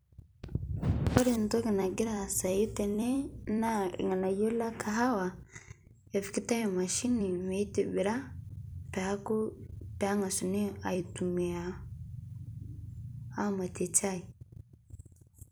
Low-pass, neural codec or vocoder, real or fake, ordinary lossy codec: none; vocoder, 44.1 kHz, 128 mel bands every 256 samples, BigVGAN v2; fake; none